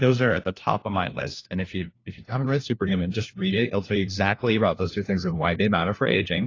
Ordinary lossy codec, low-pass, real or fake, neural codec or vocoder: AAC, 32 kbps; 7.2 kHz; fake; codec, 16 kHz, 1 kbps, FunCodec, trained on LibriTTS, 50 frames a second